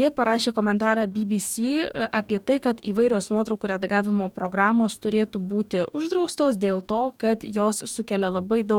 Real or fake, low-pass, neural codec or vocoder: fake; 19.8 kHz; codec, 44.1 kHz, 2.6 kbps, DAC